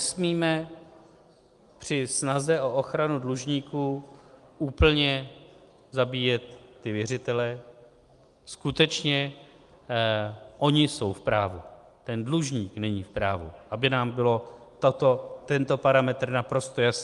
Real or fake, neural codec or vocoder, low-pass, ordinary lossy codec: real; none; 10.8 kHz; Opus, 32 kbps